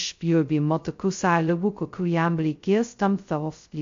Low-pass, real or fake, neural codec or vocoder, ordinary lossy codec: 7.2 kHz; fake; codec, 16 kHz, 0.2 kbps, FocalCodec; MP3, 48 kbps